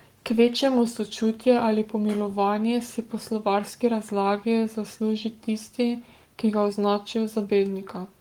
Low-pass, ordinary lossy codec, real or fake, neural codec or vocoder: 19.8 kHz; Opus, 24 kbps; fake; codec, 44.1 kHz, 7.8 kbps, Pupu-Codec